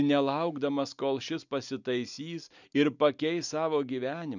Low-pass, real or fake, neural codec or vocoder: 7.2 kHz; real; none